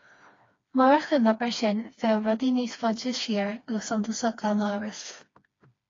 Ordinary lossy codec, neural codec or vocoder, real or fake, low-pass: AAC, 32 kbps; codec, 16 kHz, 2 kbps, FreqCodec, smaller model; fake; 7.2 kHz